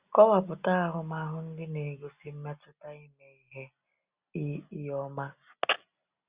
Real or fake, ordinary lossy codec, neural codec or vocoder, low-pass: real; Opus, 64 kbps; none; 3.6 kHz